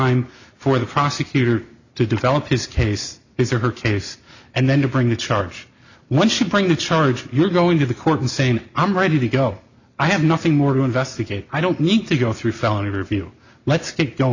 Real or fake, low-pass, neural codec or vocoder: real; 7.2 kHz; none